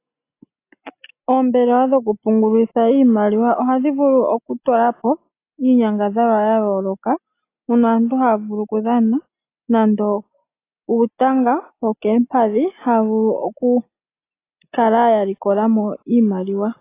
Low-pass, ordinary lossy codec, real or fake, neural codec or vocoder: 3.6 kHz; AAC, 24 kbps; real; none